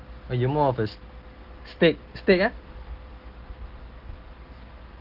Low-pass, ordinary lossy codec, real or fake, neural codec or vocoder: 5.4 kHz; Opus, 16 kbps; real; none